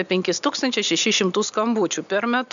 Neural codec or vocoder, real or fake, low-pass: none; real; 7.2 kHz